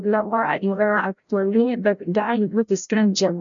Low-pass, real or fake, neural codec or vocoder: 7.2 kHz; fake; codec, 16 kHz, 0.5 kbps, FreqCodec, larger model